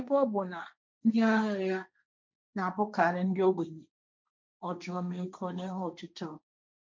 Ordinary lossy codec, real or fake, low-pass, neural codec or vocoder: none; fake; none; codec, 16 kHz, 1.1 kbps, Voila-Tokenizer